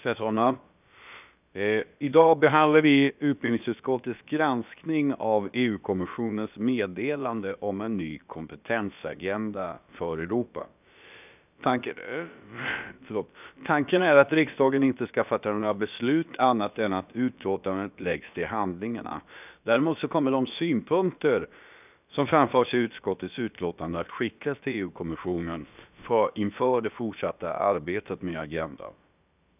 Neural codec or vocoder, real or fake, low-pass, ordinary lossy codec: codec, 16 kHz, about 1 kbps, DyCAST, with the encoder's durations; fake; 3.6 kHz; none